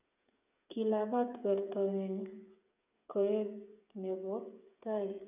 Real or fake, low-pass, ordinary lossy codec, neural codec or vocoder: fake; 3.6 kHz; none; codec, 16 kHz, 8 kbps, FreqCodec, smaller model